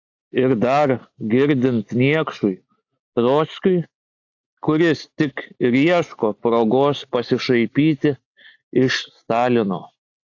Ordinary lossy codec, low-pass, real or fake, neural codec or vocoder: AAC, 48 kbps; 7.2 kHz; real; none